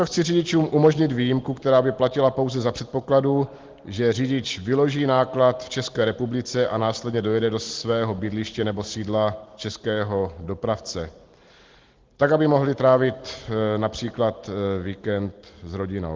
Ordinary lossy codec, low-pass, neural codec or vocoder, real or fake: Opus, 16 kbps; 7.2 kHz; none; real